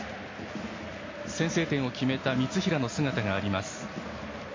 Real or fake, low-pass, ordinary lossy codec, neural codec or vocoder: real; 7.2 kHz; MP3, 32 kbps; none